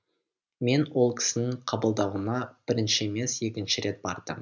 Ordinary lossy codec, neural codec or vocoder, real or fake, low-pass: none; none; real; 7.2 kHz